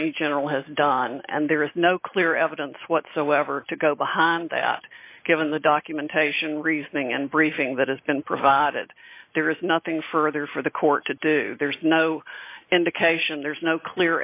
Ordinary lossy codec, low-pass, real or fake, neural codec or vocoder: AAC, 32 kbps; 3.6 kHz; real; none